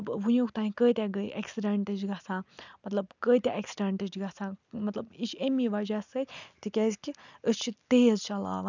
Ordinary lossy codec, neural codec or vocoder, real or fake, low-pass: none; none; real; 7.2 kHz